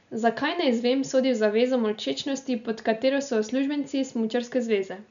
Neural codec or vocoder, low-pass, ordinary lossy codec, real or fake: none; 7.2 kHz; none; real